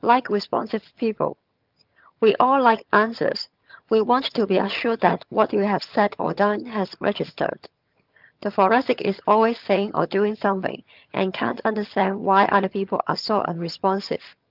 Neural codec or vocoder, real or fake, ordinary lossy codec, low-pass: vocoder, 22.05 kHz, 80 mel bands, HiFi-GAN; fake; Opus, 24 kbps; 5.4 kHz